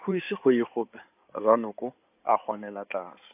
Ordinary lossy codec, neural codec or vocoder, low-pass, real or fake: AAC, 32 kbps; codec, 16 kHz in and 24 kHz out, 2.2 kbps, FireRedTTS-2 codec; 3.6 kHz; fake